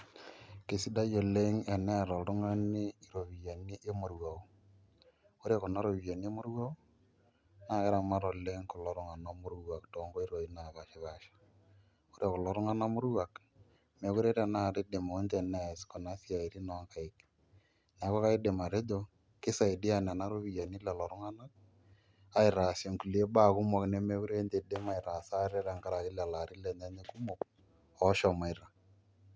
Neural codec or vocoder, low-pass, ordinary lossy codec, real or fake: none; none; none; real